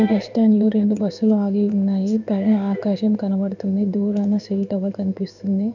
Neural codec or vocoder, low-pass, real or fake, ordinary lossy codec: codec, 16 kHz in and 24 kHz out, 1 kbps, XY-Tokenizer; 7.2 kHz; fake; none